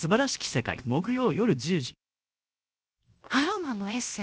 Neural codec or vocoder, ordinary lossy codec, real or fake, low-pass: codec, 16 kHz, 0.7 kbps, FocalCodec; none; fake; none